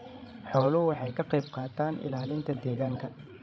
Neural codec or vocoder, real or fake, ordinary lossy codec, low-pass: codec, 16 kHz, 16 kbps, FreqCodec, larger model; fake; none; none